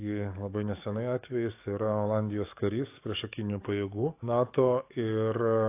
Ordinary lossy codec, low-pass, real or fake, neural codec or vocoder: AAC, 24 kbps; 3.6 kHz; fake; codec, 44.1 kHz, 7.8 kbps, DAC